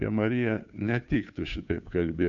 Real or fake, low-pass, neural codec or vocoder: fake; 7.2 kHz; codec, 16 kHz, 2 kbps, FunCodec, trained on Chinese and English, 25 frames a second